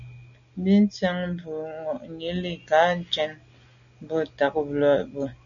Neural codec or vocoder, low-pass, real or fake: none; 7.2 kHz; real